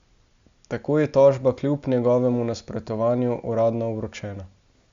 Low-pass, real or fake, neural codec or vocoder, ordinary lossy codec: 7.2 kHz; real; none; Opus, 64 kbps